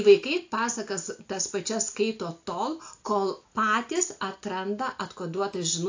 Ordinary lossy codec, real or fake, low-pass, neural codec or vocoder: AAC, 48 kbps; real; 7.2 kHz; none